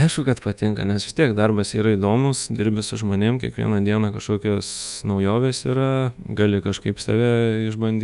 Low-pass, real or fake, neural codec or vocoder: 10.8 kHz; fake; codec, 24 kHz, 1.2 kbps, DualCodec